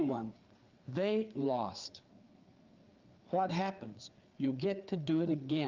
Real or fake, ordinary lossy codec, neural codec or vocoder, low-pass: fake; Opus, 32 kbps; codec, 16 kHz, 4 kbps, FreqCodec, larger model; 7.2 kHz